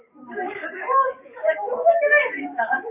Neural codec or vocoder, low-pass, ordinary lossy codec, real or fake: codec, 44.1 kHz, 7.8 kbps, DAC; 3.6 kHz; AAC, 32 kbps; fake